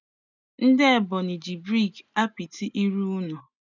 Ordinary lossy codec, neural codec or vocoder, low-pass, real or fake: none; none; 7.2 kHz; real